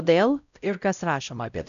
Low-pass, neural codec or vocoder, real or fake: 7.2 kHz; codec, 16 kHz, 0.5 kbps, X-Codec, WavLM features, trained on Multilingual LibriSpeech; fake